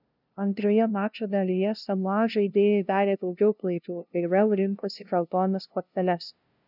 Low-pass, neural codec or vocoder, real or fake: 5.4 kHz; codec, 16 kHz, 0.5 kbps, FunCodec, trained on LibriTTS, 25 frames a second; fake